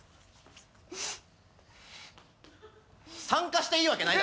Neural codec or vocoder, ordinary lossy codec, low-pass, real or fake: none; none; none; real